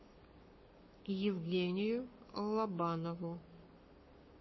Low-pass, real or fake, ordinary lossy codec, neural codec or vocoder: 7.2 kHz; fake; MP3, 24 kbps; codec, 44.1 kHz, 7.8 kbps, Pupu-Codec